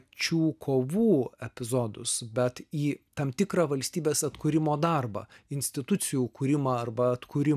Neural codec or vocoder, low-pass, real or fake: none; 14.4 kHz; real